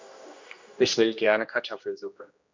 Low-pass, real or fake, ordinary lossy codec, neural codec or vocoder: 7.2 kHz; fake; MP3, 64 kbps; codec, 16 kHz, 1 kbps, X-Codec, HuBERT features, trained on general audio